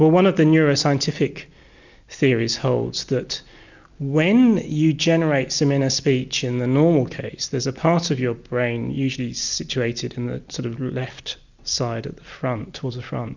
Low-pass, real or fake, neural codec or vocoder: 7.2 kHz; real; none